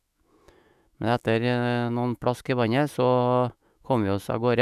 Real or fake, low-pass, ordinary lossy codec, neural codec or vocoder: fake; 14.4 kHz; none; autoencoder, 48 kHz, 128 numbers a frame, DAC-VAE, trained on Japanese speech